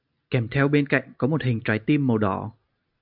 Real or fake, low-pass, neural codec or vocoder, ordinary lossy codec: real; 5.4 kHz; none; AAC, 48 kbps